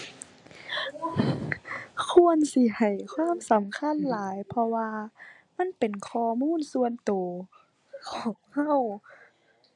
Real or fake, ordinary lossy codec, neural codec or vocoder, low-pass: real; AAC, 64 kbps; none; 10.8 kHz